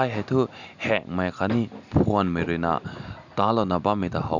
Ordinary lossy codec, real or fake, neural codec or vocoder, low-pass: none; real; none; 7.2 kHz